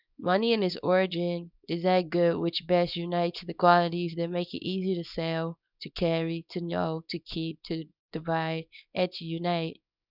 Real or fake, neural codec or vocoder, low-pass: fake; codec, 24 kHz, 0.9 kbps, WavTokenizer, small release; 5.4 kHz